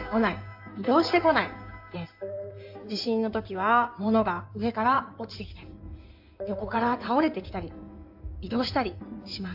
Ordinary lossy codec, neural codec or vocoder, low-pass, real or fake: AAC, 48 kbps; codec, 16 kHz in and 24 kHz out, 2.2 kbps, FireRedTTS-2 codec; 5.4 kHz; fake